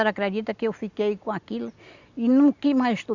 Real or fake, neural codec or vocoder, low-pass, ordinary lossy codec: real; none; 7.2 kHz; Opus, 64 kbps